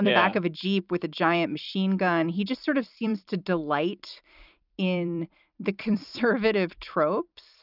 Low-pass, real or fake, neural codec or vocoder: 5.4 kHz; fake; vocoder, 44.1 kHz, 128 mel bands every 256 samples, BigVGAN v2